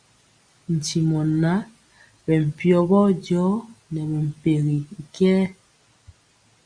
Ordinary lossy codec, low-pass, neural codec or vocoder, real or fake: Opus, 64 kbps; 9.9 kHz; none; real